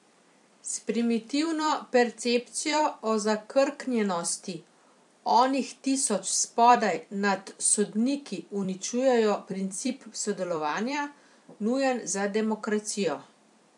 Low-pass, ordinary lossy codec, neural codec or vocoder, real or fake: 10.8 kHz; MP3, 64 kbps; vocoder, 44.1 kHz, 128 mel bands every 256 samples, BigVGAN v2; fake